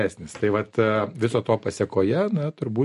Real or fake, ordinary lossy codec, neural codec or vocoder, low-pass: real; MP3, 48 kbps; none; 14.4 kHz